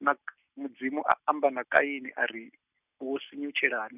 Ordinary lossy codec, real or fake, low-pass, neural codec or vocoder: none; real; 3.6 kHz; none